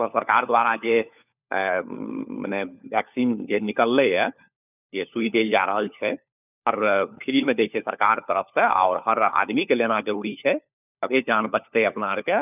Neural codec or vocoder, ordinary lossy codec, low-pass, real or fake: codec, 16 kHz, 4 kbps, FunCodec, trained on LibriTTS, 50 frames a second; none; 3.6 kHz; fake